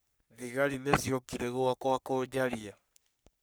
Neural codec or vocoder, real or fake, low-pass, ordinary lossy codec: codec, 44.1 kHz, 3.4 kbps, Pupu-Codec; fake; none; none